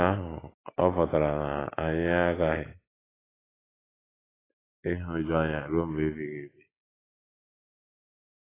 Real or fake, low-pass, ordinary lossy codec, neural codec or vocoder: real; 3.6 kHz; AAC, 16 kbps; none